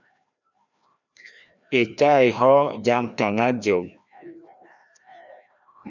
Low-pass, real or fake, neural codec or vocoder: 7.2 kHz; fake; codec, 16 kHz, 1 kbps, FreqCodec, larger model